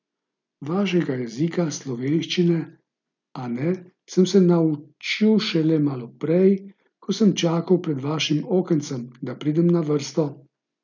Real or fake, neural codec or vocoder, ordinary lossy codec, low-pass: real; none; none; 7.2 kHz